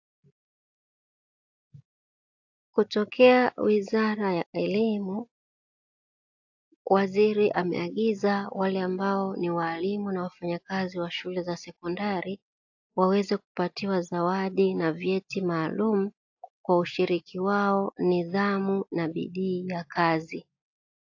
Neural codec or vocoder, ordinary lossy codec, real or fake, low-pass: none; AAC, 48 kbps; real; 7.2 kHz